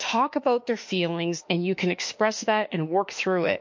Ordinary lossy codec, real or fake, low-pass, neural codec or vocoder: MP3, 48 kbps; fake; 7.2 kHz; autoencoder, 48 kHz, 32 numbers a frame, DAC-VAE, trained on Japanese speech